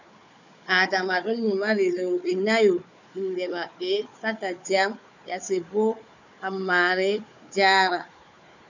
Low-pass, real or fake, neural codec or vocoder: 7.2 kHz; fake; codec, 16 kHz, 4 kbps, FunCodec, trained on Chinese and English, 50 frames a second